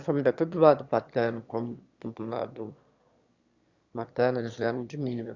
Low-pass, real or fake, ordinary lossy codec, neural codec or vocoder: 7.2 kHz; fake; Opus, 64 kbps; autoencoder, 22.05 kHz, a latent of 192 numbers a frame, VITS, trained on one speaker